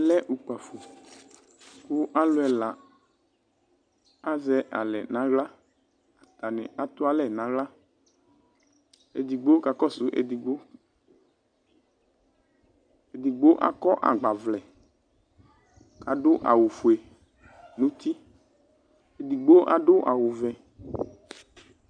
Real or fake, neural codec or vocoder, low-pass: real; none; 9.9 kHz